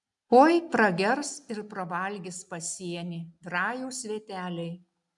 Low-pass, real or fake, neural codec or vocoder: 10.8 kHz; real; none